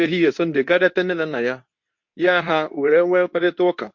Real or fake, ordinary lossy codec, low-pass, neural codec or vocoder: fake; MP3, 48 kbps; 7.2 kHz; codec, 24 kHz, 0.9 kbps, WavTokenizer, medium speech release version 2